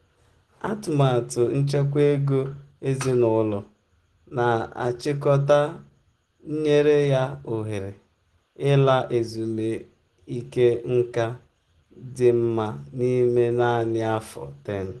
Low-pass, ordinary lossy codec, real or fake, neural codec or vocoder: 10.8 kHz; Opus, 16 kbps; real; none